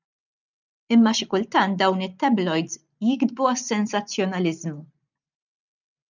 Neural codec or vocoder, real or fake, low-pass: none; real; 7.2 kHz